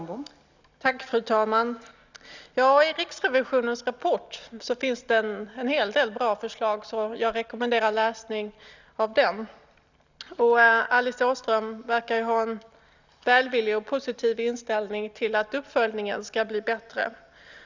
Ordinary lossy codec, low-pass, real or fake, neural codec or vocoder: none; 7.2 kHz; real; none